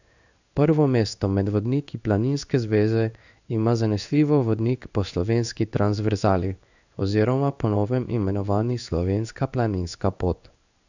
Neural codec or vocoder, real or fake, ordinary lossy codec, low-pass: codec, 16 kHz in and 24 kHz out, 1 kbps, XY-Tokenizer; fake; none; 7.2 kHz